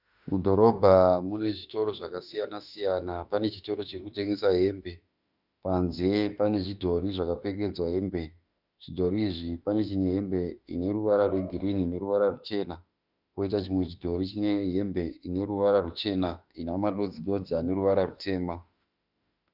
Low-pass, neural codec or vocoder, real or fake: 5.4 kHz; autoencoder, 48 kHz, 32 numbers a frame, DAC-VAE, trained on Japanese speech; fake